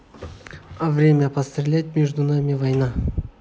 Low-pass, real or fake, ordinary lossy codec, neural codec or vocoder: none; real; none; none